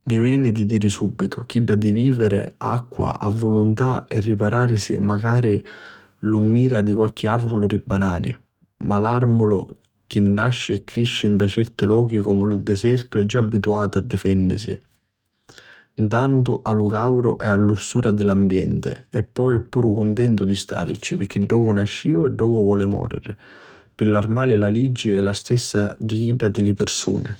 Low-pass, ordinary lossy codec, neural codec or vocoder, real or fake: 19.8 kHz; none; codec, 44.1 kHz, 2.6 kbps, DAC; fake